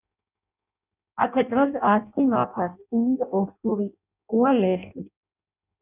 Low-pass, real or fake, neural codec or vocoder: 3.6 kHz; fake; codec, 16 kHz in and 24 kHz out, 0.6 kbps, FireRedTTS-2 codec